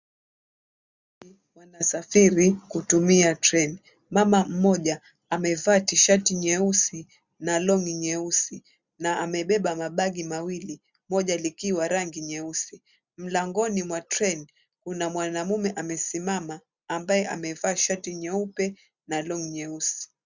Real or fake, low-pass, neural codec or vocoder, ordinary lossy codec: real; 7.2 kHz; none; Opus, 64 kbps